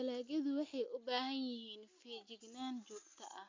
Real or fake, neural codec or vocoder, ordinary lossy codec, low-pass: real; none; MP3, 48 kbps; 7.2 kHz